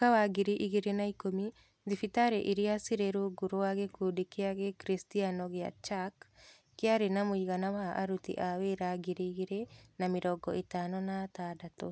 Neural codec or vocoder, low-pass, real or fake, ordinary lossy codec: none; none; real; none